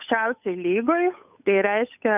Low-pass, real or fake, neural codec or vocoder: 3.6 kHz; fake; codec, 16 kHz, 8 kbps, FunCodec, trained on Chinese and English, 25 frames a second